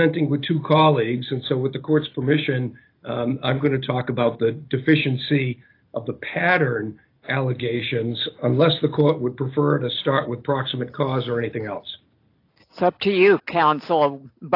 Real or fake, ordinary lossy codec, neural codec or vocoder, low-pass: real; AAC, 32 kbps; none; 5.4 kHz